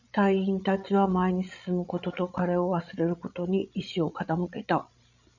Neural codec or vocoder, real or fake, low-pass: codec, 16 kHz, 16 kbps, FreqCodec, larger model; fake; 7.2 kHz